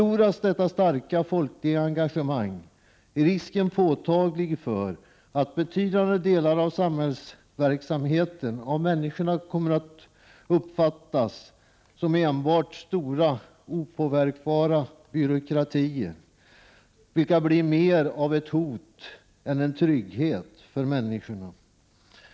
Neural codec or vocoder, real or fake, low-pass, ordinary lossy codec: none; real; none; none